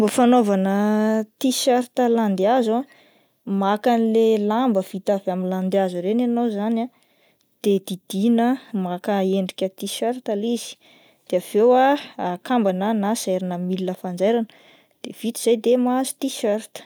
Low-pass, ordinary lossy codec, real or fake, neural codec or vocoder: none; none; real; none